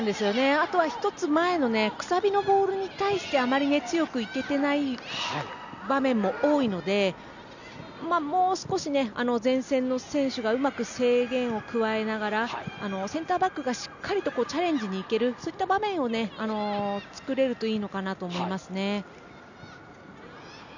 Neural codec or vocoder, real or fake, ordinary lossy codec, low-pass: none; real; none; 7.2 kHz